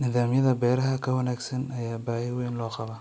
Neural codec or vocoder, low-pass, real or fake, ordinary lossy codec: none; none; real; none